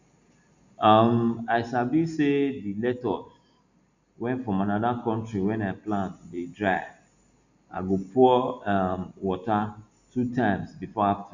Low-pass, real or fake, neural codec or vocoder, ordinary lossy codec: 7.2 kHz; real; none; none